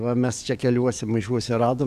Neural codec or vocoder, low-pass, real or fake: codec, 44.1 kHz, 7.8 kbps, DAC; 14.4 kHz; fake